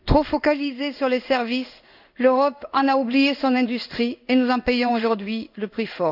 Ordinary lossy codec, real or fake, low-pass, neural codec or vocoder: none; fake; 5.4 kHz; codec, 16 kHz in and 24 kHz out, 1 kbps, XY-Tokenizer